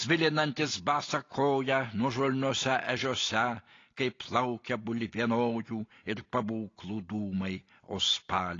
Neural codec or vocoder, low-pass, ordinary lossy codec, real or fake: none; 7.2 kHz; AAC, 32 kbps; real